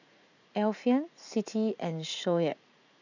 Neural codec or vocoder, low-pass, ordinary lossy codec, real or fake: vocoder, 44.1 kHz, 80 mel bands, Vocos; 7.2 kHz; none; fake